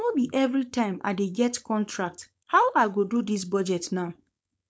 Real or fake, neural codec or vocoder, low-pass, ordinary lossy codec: fake; codec, 16 kHz, 4.8 kbps, FACodec; none; none